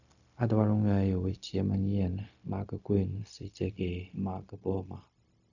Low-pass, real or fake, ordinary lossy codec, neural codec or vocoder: 7.2 kHz; fake; none; codec, 16 kHz, 0.4 kbps, LongCat-Audio-Codec